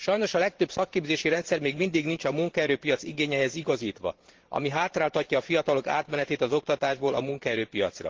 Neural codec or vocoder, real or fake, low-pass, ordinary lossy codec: vocoder, 44.1 kHz, 128 mel bands every 512 samples, BigVGAN v2; fake; 7.2 kHz; Opus, 16 kbps